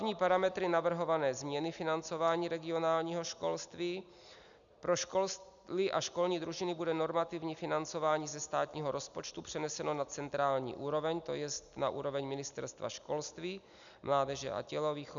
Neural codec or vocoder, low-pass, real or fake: none; 7.2 kHz; real